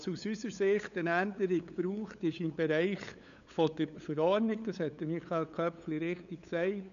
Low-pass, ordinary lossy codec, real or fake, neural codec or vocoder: 7.2 kHz; none; fake; codec, 16 kHz, 8 kbps, FunCodec, trained on LibriTTS, 25 frames a second